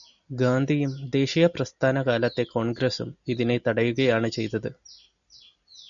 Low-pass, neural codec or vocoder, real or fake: 7.2 kHz; none; real